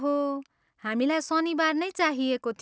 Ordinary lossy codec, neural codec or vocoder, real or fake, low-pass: none; none; real; none